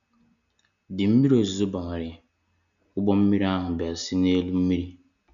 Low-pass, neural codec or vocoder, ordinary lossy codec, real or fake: 7.2 kHz; none; none; real